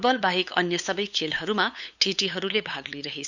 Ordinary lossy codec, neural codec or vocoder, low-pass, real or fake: none; codec, 16 kHz, 8 kbps, FunCodec, trained on LibriTTS, 25 frames a second; 7.2 kHz; fake